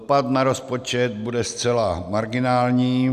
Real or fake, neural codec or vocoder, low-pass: real; none; 14.4 kHz